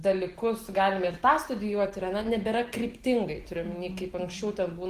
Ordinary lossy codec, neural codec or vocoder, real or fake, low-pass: Opus, 16 kbps; none; real; 14.4 kHz